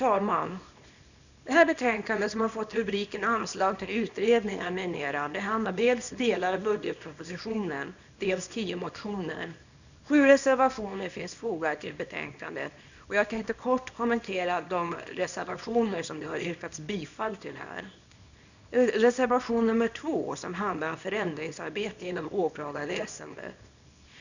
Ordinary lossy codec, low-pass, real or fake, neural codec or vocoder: none; 7.2 kHz; fake; codec, 24 kHz, 0.9 kbps, WavTokenizer, small release